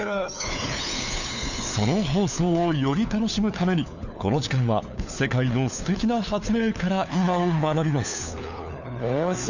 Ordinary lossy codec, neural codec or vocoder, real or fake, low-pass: none; codec, 16 kHz, 4 kbps, FunCodec, trained on LibriTTS, 50 frames a second; fake; 7.2 kHz